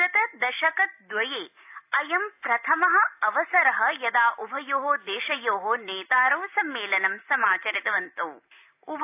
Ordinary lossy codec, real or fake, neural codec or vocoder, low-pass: AAC, 32 kbps; real; none; 3.6 kHz